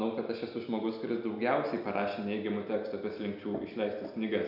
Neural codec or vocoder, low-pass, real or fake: none; 5.4 kHz; real